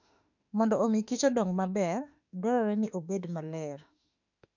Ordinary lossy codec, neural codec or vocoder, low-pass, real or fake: none; autoencoder, 48 kHz, 32 numbers a frame, DAC-VAE, trained on Japanese speech; 7.2 kHz; fake